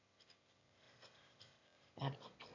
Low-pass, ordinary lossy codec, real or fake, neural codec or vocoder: 7.2 kHz; none; fake; autoencoder, 22.05 kHz, a latent of 192 numbers a frame, VITS, trained on one speaker